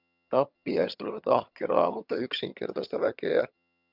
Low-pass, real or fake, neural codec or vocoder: 5.4 kHz; fake; vocoder, 22.05 kHz, 80 mel bands, HiFi-GAN